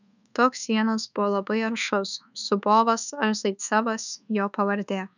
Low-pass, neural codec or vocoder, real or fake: 7.2 kHz; codec, 24 kHz, 1.2 kbps, DualCodec; fake